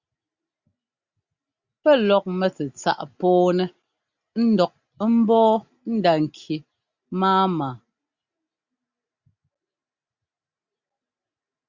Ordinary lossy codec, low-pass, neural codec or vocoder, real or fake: Opus, 64 kbps; 7.2 kHz; none; real